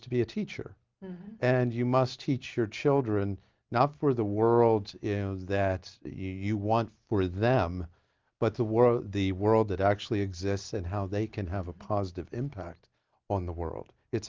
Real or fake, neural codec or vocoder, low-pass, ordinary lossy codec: real; none; 7.2 kHz; Opus, 32 kbps